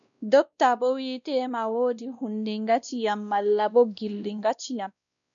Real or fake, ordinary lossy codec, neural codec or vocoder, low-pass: fake; MP3, 96 kbps; codec, 16 kHz, 1 kbps, X-Codec, WavLM features, trained on Multilingual LibriSpeech; 7.2 kHz